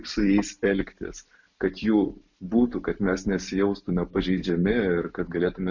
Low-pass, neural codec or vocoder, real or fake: 7.2 kHz; none; real